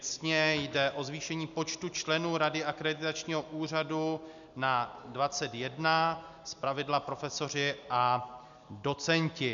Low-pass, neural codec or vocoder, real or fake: 7.2 kHz; none; real